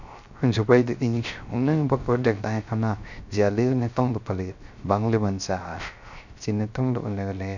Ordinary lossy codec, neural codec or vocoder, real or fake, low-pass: none; codec, 16 kHz, 0.3 kbps, FocalCodec; fake; 7.2 kHz